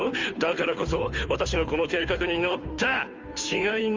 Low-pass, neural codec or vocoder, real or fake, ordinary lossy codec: 7.2 kHz; codec, 16 kHz, 2 kbps, FunCodec, trained on Chinese and English, 25 frames a second; fake; Opus, 32 kbps